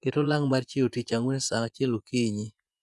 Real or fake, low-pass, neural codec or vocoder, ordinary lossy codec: fake; none; vocoder, 24 kHz, 100 mel bands, Vocos; none